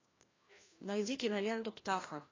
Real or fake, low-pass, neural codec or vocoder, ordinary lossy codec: fake; 7.2 kHz; codec, 16 kHz, 1 kbps, FreqCodec, larger model; AAC, 32 kbps